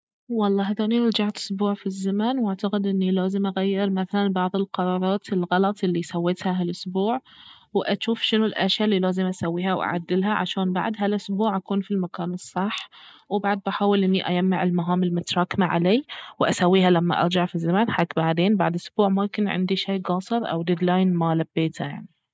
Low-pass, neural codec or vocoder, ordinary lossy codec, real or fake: none; none; none; real